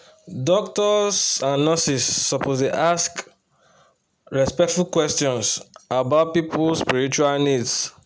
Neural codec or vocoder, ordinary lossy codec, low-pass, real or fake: none; none; none; real